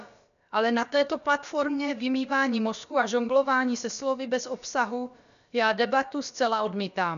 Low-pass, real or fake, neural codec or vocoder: 7.2 kHz; fake; codec, 16 kHz, about 1 kbps, DyCAST, with the encoder's durations